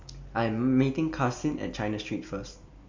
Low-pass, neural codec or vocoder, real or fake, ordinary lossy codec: 7.2 kHz; none; real; MP3, 64 kbps